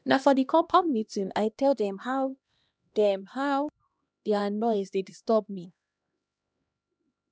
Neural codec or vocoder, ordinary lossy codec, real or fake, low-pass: codec, 16 kHz, 1 kbps, X-Codec, HuBERT features, trained on LibriSpeech; none; fake; none